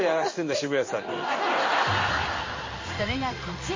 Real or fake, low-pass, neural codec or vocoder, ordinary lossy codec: fake; 7.2 kHz; codec, 16 kHz in and 24 kHz out, 1 kbps, XY-Tokenizer; MP3, 32 kbps